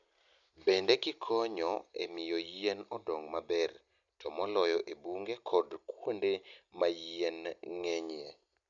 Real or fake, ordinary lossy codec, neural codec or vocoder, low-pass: real; none; none; 7.2 kHz